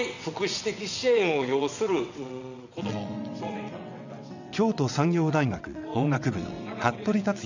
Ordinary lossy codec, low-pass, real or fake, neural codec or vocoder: none; 7.2 kHz; fake; vocoder, 22.05 kHz, 80 mel bands, WaveNeXt